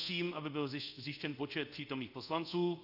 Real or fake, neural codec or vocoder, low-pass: fake; codec, 24 kHz, 0.5 kbps, DualCodec; 5.4 kHz